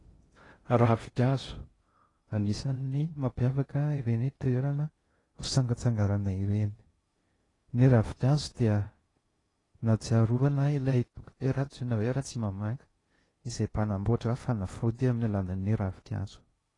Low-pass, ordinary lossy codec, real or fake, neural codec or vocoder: 10.8 kHz; AAC, 32 kbps; fake; codec, 16 kHz in and 24 kHz out, 0.6 kbps, FocalCodec, streaming, 2048 codes